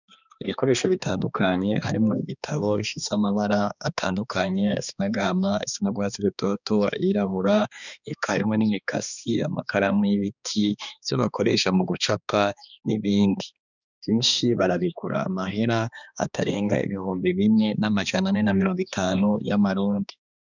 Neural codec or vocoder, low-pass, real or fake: codec, 16 kHz, 2 kbps, X-Codec, HuBERT features, trained on balanced general audio; 7.2 kHz; fake